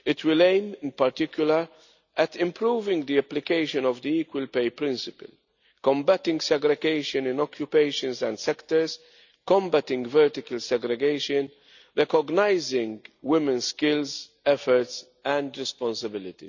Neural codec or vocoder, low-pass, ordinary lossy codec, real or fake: none; 7.2 kHz; none; real